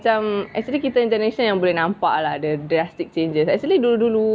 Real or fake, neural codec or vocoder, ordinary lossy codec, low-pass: real; none; none; none